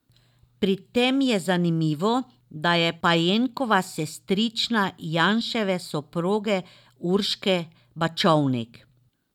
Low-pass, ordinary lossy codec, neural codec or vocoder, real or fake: 19.8 kHz; none; none; real